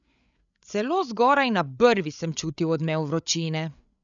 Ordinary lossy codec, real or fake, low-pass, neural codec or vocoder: none; fake; 7.2 kHz; codec, 16 kHz, 8 kbps, FreqCodec, larger model